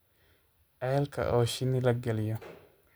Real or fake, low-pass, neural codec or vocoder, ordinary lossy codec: real; none; none; none